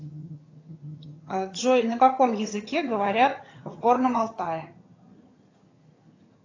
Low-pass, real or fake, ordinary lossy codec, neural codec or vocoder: 7.2 kHz; fake; AAC, 32 kbps; vocoder, 22.05 kHz, 80 mel bands, HiFi-GAN